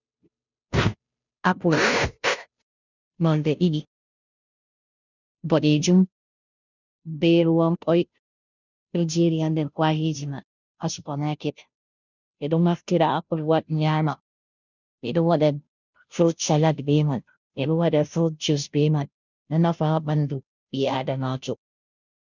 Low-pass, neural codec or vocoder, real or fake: 7.2 kHz; codec, 16 kHz, 0.5 kbps, FunCodec, trained on Chinese and English, 25 frames a second; fake